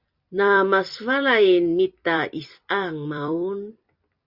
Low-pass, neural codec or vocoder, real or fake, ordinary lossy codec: 5.4 kHz; vocoder, 44.1 kHz, 128 mel bands every 256 samples, BigVGAN v2; fake; Opus, 64 kbps